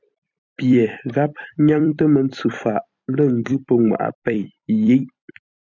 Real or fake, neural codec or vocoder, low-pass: fake; vocoder, 44.1 kHz, 128 mel bands every 256 samples, BigVGAN v2; 7.2 kHz